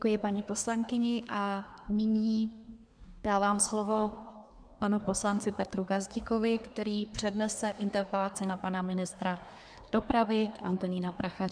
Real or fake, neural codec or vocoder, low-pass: fake; codec, 24 kHz, 1 kbps, SNAC; 9.9 kHz